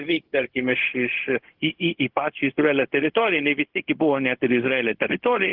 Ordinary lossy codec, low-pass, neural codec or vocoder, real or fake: Opus, 16 kbps; 5.4 kHz; codec, 16 kHz, 0.4 kbps, LongCat-Audio-Codec; fake